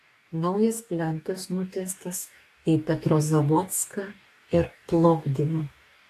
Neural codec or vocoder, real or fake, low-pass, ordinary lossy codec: codec, 44.1 kHz, 2.6 kbps, DAC; fake; 14.4 kHz; AAC, 64 kbps